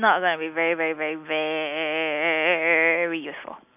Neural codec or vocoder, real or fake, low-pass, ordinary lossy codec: none; real; 3.6 kHz; none